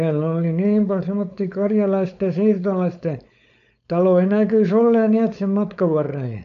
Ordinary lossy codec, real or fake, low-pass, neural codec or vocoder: none; fake; 7.2 kHz; codec, 16 kHz, 4.8 kbps, FACodec